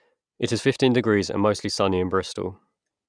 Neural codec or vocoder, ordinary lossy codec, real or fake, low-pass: none; Opus, 64 kbps; real; 9.9 kHz